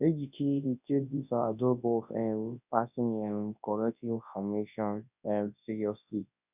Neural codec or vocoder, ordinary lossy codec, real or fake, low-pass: codec, 24 kHz, 0.9 kbps, WavTokenizer, large speech release; none; fake; 3.6 kHz